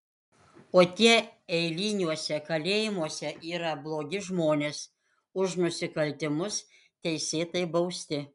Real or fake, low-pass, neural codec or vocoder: real; 10.8 kHz; none